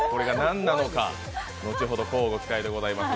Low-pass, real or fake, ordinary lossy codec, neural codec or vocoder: none; real; none; none